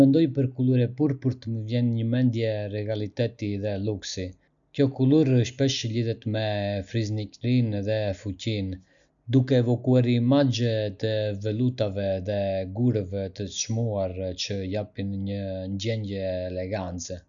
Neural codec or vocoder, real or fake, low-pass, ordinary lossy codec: none; real; 7.2 kHz; none